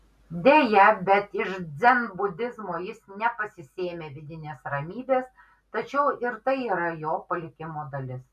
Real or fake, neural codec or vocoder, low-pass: real; none; 14.4 kHz